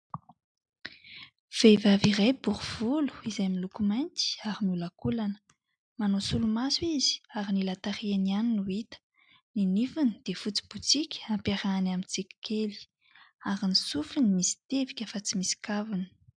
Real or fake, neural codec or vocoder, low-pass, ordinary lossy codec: real; none; 9.9 kHz; MP3, 96 kbps